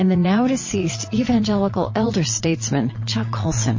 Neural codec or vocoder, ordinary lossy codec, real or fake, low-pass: vocoder, 22.05 kHz, 80 mel bands, Vocos; MP3, 32 kbps; fake; 7.2 kHz